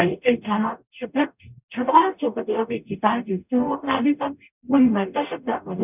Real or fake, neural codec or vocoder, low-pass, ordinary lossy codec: fake; codec, 44.1 kHz, 0.9 kbps, DAC; 3.6 kHz; none